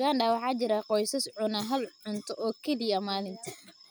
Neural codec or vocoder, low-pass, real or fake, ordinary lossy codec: none; none; real; none